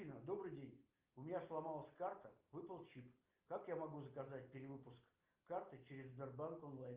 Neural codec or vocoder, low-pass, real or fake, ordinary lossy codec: none; 3.6 kHz; real; Opus, 32 kbps